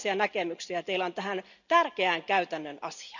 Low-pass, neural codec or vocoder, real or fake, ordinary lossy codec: 7.2 kHz; none; real; none